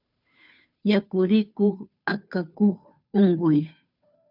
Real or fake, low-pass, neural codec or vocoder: fake; 5.4 kHz; codec, 16 kHz, 2 kbps, FunCodec, trained on Chinese and English, 25 frames a second